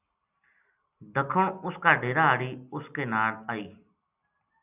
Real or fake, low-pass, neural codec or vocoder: real; 3.6 kHz; none